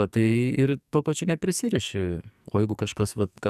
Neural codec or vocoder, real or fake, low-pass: codec, 44.1 kHz, 2.6 kbps, SNAC; fake; 14.4 kHz